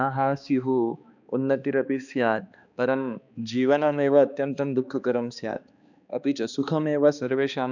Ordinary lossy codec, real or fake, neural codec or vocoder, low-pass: none; fake; codec, 16 kHz, 2 kbps, X-Codec, HuBERT features, trained on balanced general audio; 7.2 kHz